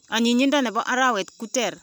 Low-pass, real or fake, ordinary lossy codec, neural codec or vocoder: none; real; none; none